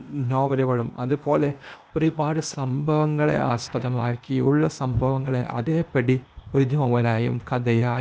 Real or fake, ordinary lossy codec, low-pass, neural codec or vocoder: fake; none; none; codec, 16 kHz, 0.8 kbps, ZipCodec